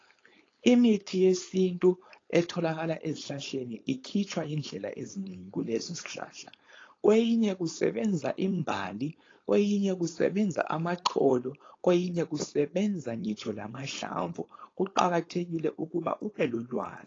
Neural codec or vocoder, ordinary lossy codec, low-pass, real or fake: codec, 16 kHz, 4.8 kbps, FACodec; AAC, 32 kbps; 7.2 kHz; fake